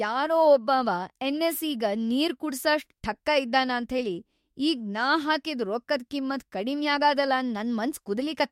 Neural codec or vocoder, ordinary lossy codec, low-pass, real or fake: autoencoder, 48 kHz, 32 numbers a frame, DAC-VAE, trained on Japanese speech; MP3, 64 kbps; 19.8 kHz; fake